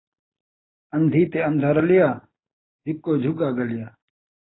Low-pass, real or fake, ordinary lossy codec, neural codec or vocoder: 7.2 kHz; real; AAC, 16 kbps; none